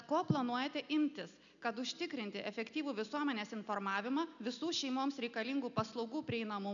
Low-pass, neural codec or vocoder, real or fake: 7.2 kHz; none; real